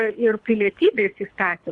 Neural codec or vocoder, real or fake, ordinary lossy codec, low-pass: codec, 24 kHz, 3 kbps, HILCodec; fake; Opus, 64 kbps; 10.8 kHz